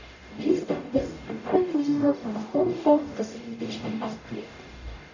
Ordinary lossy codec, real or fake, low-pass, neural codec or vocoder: none; fake; 7.2 kHz; codec, 44.1 kHz, 0.9 kbps, DAC